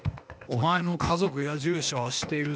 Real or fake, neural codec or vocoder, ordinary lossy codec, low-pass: fake; codec, 16 kHz, 0.8 kbps, ZipCodec; none; none